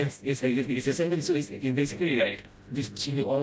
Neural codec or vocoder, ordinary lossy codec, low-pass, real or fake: codec, 16 kHz, 0.5 kbps, FreqCodec, smaller model; none; none; fake